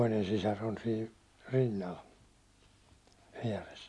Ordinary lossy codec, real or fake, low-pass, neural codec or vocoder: none; real; none; none